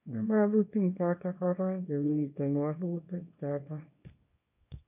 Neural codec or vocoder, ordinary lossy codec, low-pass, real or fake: codec, 24 kHz, 0.9 kbps, WavTokenizer, small release; none; 3.6 kHz; fake